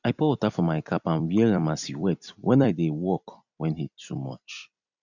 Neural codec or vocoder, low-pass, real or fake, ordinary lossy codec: vocoder, 44.1 kHz, 128 mel bands every 256 samples, BigVGAN v2; 7.2 kHz; fake; none